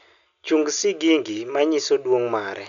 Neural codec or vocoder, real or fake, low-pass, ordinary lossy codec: none; real; 7.2 kHz; none